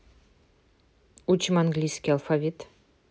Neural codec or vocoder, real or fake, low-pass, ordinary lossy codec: none; real; none; none